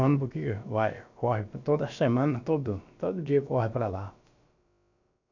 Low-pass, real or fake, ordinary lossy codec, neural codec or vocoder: 7.2 kHz; fake; none; codec, 16 kHz, about 1 kbps, DyCAST, with the encoder's durations